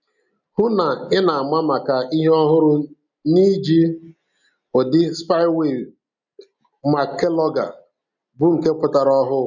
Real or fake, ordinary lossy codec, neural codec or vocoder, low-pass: real; none; none; 7.2 kHz